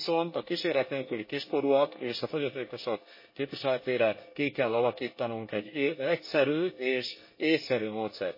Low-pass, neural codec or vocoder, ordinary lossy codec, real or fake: 5.4 kHz; codec, 24 kHz, 1 kbps, SNAC; MP3, 24 kbps; fake